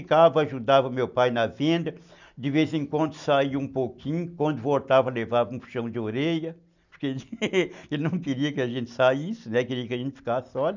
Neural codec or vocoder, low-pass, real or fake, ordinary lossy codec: none; 7.2 kHz; real; none